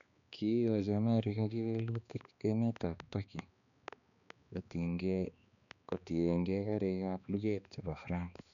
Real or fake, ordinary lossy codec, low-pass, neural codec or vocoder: fake; none; 7.2 kHz; codec, 16 kHz, 2 kbps, X-Codec, HuBERT features, trained on balanced general audio